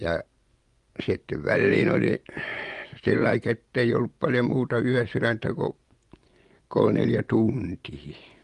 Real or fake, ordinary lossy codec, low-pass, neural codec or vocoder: fake; Opus, 32 kbps; 10.8 kHz; vocoder, 24 kHz, 100 mel bands, Vocos